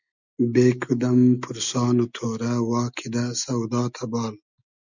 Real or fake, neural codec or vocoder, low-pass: real; none; 7.2 kHz